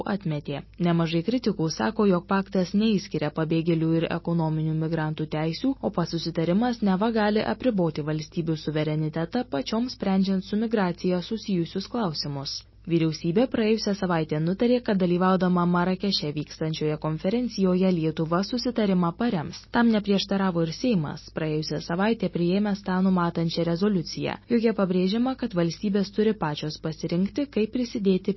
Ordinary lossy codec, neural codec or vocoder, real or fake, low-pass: MP3, 24 kbps; none; real; 7.2 kHz